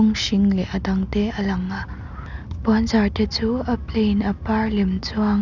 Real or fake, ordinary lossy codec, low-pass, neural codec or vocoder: real; none; 7.2 kHz; none